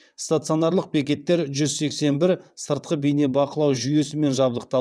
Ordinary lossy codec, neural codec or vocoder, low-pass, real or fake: none; vocoder, 22.05 kHz, 80 mel bands, WaveNeXt; none; fake